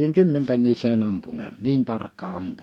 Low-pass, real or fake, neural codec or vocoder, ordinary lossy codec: 19.8 kHz; fake; codec, 44.1 kHz, 2.6 kbps, DAC; none